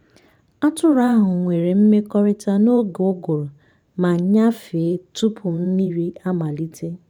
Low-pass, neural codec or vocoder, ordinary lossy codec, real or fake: 19.8 kHz; vocoder, 44.1 kHz, 128 mel bands every 512 samples, BigVGAN v2; none; fake